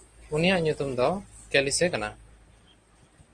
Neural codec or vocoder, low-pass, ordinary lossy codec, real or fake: none; 9.9 kHz; Opus, 24 kbps; real